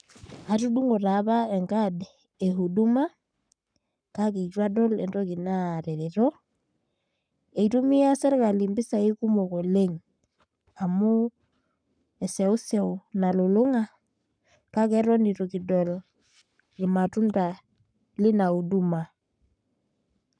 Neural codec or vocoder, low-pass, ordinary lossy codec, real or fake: codec, 44.1 kHz, 7.8 kbps, Pupu-Codec; 9.9 kHz; none; fake